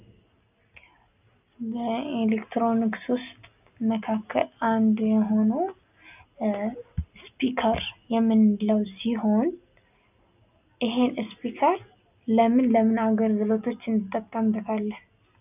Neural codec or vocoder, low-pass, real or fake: none; 3.6 kHz; real